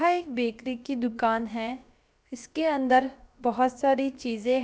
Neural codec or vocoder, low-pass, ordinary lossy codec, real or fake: codec, 16 kHz, about 1 kbps, DyCAST, with the encoder's durations; none; none; fake